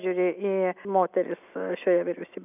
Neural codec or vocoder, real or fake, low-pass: none; real; 3.6 kHz